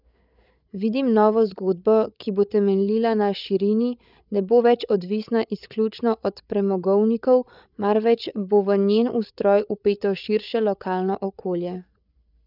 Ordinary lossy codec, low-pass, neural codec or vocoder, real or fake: none; 5.4 kHz; codec, 16 kHz, 8 kbps, FreqCodec, larger model; fake